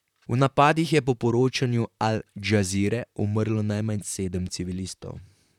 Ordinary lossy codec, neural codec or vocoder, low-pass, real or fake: none; vocoder, 44.1 kHz, 128 mel bands, Pupu-Vocoder; 19.8 kHz; fake